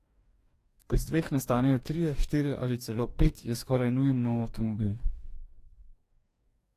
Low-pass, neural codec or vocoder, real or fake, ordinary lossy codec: 14.4 kHz; codec, 44.1 kHz, 2.6 kbps, DAC; fake; AAC, 64 kbps